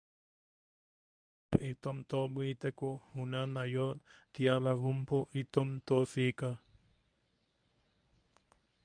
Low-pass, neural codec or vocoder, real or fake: 9.9 kHz; codec, 24 kHz, 0.9 kbps, WavTokenizer, medium speech release version 1; fake